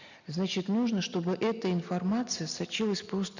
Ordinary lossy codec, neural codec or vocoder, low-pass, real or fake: none; none; 7.2 kHz; real